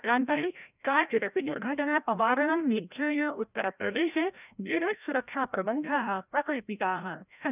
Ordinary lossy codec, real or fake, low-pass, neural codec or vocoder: none; fake; 3.6 kHz; codec, 16 kHz, 0.5 kbps, FreqCodec, larger model